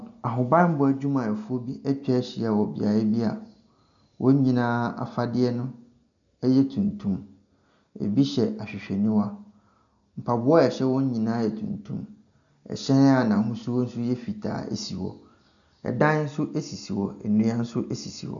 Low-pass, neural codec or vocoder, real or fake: 7.2 kHz; none; real